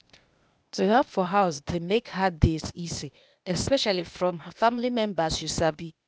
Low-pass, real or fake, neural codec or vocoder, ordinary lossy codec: none; fake; codec, 16 kHz, 0.8 kbps, ZipCodec; none